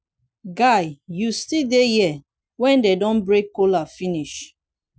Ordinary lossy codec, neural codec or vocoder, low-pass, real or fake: none; none; none; real